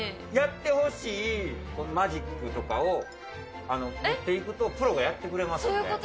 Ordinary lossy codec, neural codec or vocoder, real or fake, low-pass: none; none; real; none